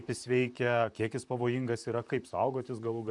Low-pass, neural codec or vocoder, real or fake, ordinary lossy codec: 10.8 kHz; vocoder, 44.1 kHz, 128 mel bands, Pupu-Vocoder; fake; MP3, 96 kbps